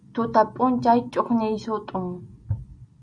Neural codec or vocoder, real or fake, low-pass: none; real; 9.9 kHz